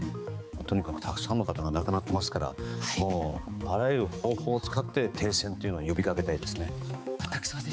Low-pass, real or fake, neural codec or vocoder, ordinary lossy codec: none; fake; codec, 16 kHz, 4 kbps, X-Codec, HuBERT features, trained on balanced general audio; none